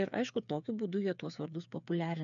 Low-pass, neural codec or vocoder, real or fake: 7.2 kHz; codec, 16 kHz, 8 kbps, FreqCodec, smaller model; fake